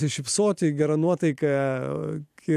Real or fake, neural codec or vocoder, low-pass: fake; vocoder, 44.1 kHz, 128 mel bands every 512 samples, BigVGAN v2; 14.4 kHz